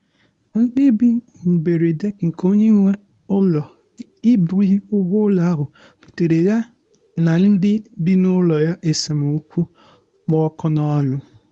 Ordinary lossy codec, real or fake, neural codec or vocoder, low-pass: none; fake; codec, 24 kHz, 0.9 kbps, WavTokenizer, medium speech release version 1; 10.8 kHz